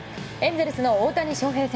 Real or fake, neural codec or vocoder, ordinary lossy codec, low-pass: real; none; none; none